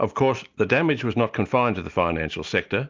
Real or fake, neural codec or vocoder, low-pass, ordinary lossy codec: real; none; 7.2 kHz; Opus, 32 kbps